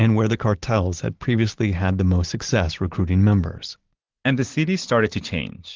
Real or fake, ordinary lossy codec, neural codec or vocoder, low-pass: real; Opus, 16 kbps; none; 7.2 kHz